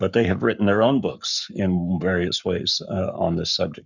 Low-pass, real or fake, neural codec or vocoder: 7.2 kHz; fake; codec, 44.1 kHz, 7.8 kbps, Pupu-Codec